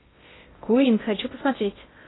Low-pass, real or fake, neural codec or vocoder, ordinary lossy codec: 7.2 kHz; fake; codec, 16 kHz in and 24 kHz out, 0.6 kbps, FocalCodec, streaming, 2048 codes; AAC, 16 kbps